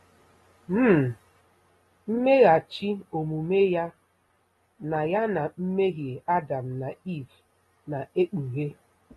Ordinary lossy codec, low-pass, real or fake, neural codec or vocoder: AAC, 32 kbps; 14.4 kHz; real; none